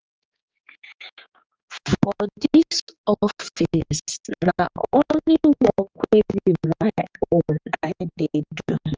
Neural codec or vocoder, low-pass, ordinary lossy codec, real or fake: codec, 16 kHz, 4 kbps, X-Codec, HuBERT features, trained on general audio; 7.2 kHz; Opus, 24 kbps; fake